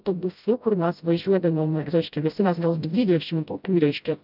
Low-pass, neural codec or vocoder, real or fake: 5.4 kHz; codec, 16 kHz, 0.5 kbps, FreqCodec, smaller model; fake